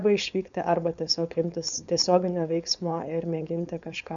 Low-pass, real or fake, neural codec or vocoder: 7.2 kHz; fake; codec, 16 kHz, 4.8 kbps, FACodec